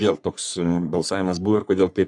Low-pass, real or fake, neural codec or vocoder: 10.8 kHz; fake; codec, 44.1 kHz, 3.4 kbps, Pupu-Codec